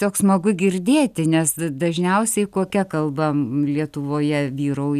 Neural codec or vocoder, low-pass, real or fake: none; 14.4 kHz; real